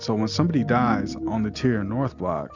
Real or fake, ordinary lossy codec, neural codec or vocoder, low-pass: real; Opus, 64 kbps; none; 7.2 kHz